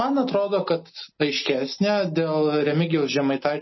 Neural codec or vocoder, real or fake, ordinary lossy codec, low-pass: none; real; MP3, 24 kbps; 7.2 kHz